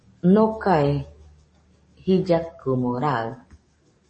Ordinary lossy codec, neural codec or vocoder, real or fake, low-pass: MP3, 32 kbps; codec, 44.1 kHz, 7.8 kbps, DAC; fake; 10.8 kHz